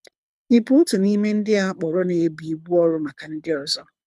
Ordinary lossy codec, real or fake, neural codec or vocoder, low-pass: none; fake; codec, 24 kHz, 6 kbps, HILCodec; none